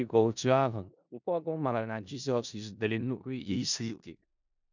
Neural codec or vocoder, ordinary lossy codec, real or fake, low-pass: codec, 16 kHz in and 24 kHz out, 0.4 kbps, LongCat-Audio-Codec, four codebook decoder; none; fake; 7.2 kHz